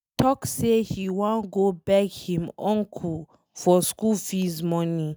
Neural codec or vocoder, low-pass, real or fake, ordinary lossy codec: none; none; real; none